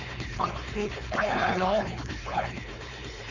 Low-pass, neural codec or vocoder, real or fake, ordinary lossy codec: 7.2 kHz; codec, 16 kHz, 4.8 kbps, FACodec; fake; none